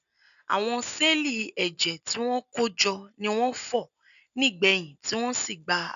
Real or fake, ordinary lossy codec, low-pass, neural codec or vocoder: real; none; 7.2 kHz; none